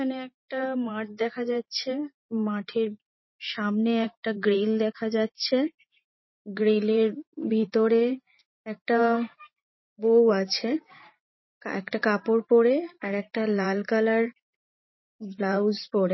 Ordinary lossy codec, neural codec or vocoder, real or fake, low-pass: MP3, 24 kbps; vocoder, 44.1 kHz, 128 mel bands every 512 samples, BigVGAN v2; fake; 7.2 kHz